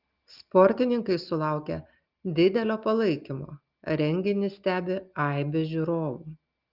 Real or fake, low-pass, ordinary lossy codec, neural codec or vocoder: real; 5.4 kHz; Opus, 24 kbps; none